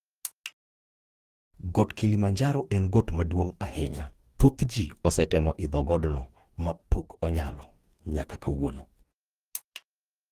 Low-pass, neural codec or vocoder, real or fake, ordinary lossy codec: 14.4 kHz; codec, 44.1 kHz, 2.6 kbps, DAC; fake; Opus, 24 kbps